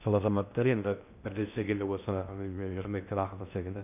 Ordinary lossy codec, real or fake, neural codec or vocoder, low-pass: none; fake; codec, 16 kHz in and 24 kHz out, 0.6 kbps, FocalCodec, streaming, 2048 codes; 3.6 kHz